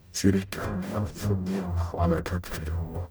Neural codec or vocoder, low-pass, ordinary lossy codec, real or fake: codec, 44.1 kHz, 0.9 kbps, DAC; none; none; fake